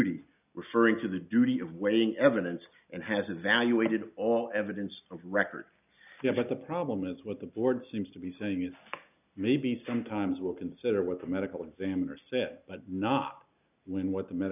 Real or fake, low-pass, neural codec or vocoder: real; 3.6 kHz; none